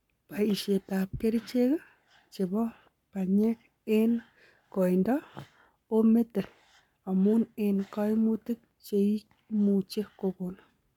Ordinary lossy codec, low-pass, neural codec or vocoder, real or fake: none; 19.8 kHz; codec, 44.1 kHz, 7.8 kbps, Pupu-Codec; fake